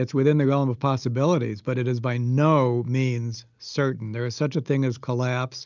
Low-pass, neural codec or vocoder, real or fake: 7.2 kHz; none; real